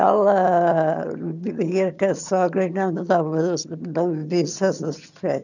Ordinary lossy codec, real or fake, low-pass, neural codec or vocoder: none; fake; 7.2 kHz; vocoder, 22.05 kHz, 80 mel bands, HiFi-GAN